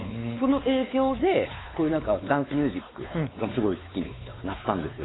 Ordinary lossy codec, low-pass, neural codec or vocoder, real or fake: AAC, 16 kbps; 7.2 kHz; codec, 16 kHz, 4 kbps, X-Codec, WavLM features, trained on Multilingual LibriSpeech; fake